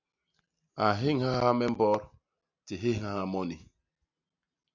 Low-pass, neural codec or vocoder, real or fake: 7.2 kHz; none; real